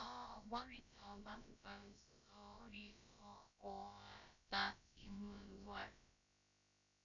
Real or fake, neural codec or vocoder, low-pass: fake; codec, 16 kHz, about 1 kbps, DyCAST, with the encoder's durations; 7.2 kHz